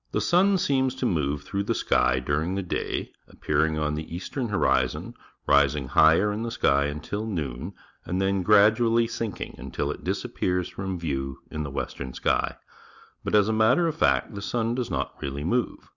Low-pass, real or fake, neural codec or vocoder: 7.2 kHz; real; none